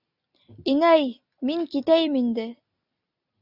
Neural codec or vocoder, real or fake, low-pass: none; real; 5.4 kHz